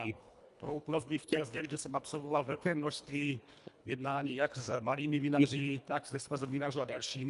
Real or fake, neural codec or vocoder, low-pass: fake; codec, 24 kHz, 1.5 kbps, HILCodec; 10.8 kHz